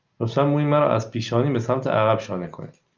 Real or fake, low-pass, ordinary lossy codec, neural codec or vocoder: real; 7.2 kHz; Opus, 32 kbps; none